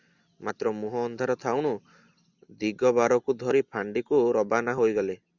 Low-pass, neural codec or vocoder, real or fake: 7.2 kHz; none; real